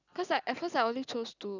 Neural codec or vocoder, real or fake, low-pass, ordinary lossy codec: none; real; 7.2 kHz; none